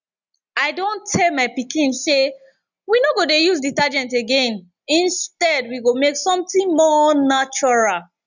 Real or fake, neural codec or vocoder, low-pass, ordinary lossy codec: real; none; 7.2 kHz; none